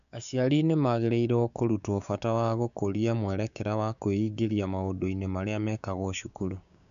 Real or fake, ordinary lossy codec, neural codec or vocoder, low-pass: fake; none; codec, 16 kHz, 6 kbps, DAC; 7.2 kHz